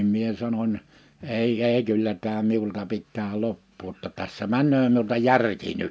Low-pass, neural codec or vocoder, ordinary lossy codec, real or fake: none; none; none; real